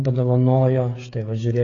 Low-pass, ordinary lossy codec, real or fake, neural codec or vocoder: 7.2 kHz; AAC, 32 kbps; fake; codec, 16 kHz, 16 kbps, FreqCodec, smaller model